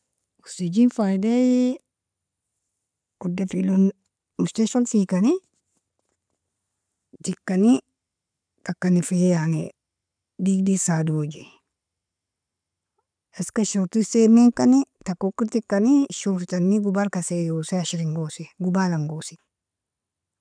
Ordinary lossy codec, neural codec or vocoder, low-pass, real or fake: none; none; 9.9 kHz; real